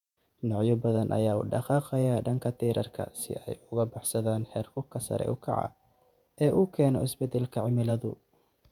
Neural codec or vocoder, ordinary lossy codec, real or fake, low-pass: vocoder, 48 kHz, 128 mel bands, Vocos; none; fake; 19.8 kHz